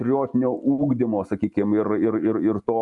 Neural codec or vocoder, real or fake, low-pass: none; real; 10.8 kHz